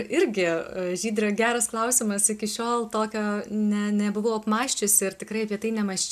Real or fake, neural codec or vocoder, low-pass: real; none; 14.4 kHz